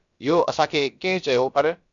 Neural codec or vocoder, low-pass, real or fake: codec, 16 kHz, about 1 kbps, DyCAST, with the encoder's durations; 7.2 kHz; fake